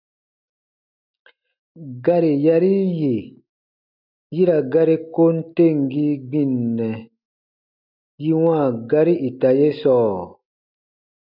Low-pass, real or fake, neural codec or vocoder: 5.4 kHz; real; none